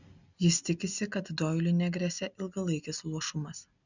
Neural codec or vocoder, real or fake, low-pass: none; real; 7.2 kHz